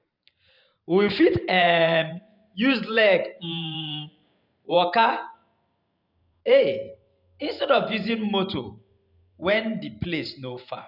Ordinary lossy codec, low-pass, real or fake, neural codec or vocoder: none; 5.4 kHz; fake; vocoder, 44.1 kHz, 128 mel bands every 512 samples, BigVGAN v2